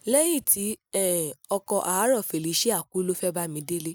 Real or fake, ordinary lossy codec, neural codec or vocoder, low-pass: real; none; none; none